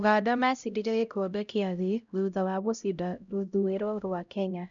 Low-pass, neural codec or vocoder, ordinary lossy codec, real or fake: 7.2 kHz; codec, 16 kHz, 0.5 kbps, X-Codec, HuBERT features, trained on LibriSpeech; none; fake